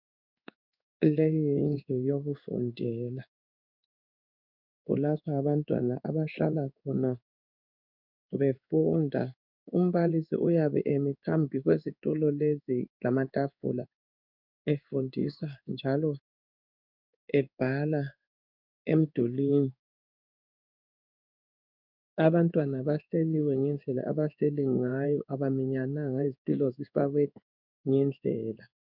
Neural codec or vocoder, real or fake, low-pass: codec, 16 kHz in and 24 kHz out, 1 kbps, XY-Tokenizer; fake; 5.4 kHz